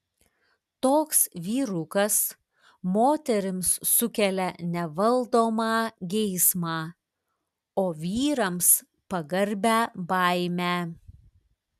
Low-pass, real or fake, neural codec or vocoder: 14.4 kHz; real; none